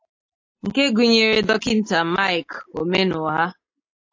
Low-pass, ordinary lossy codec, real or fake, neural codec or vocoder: 7.2 kHz; AAC, 48 kbps; real; none